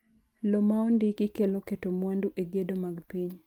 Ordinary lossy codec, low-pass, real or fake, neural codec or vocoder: Opus, 32 kbps; 19.8 kHz; real; none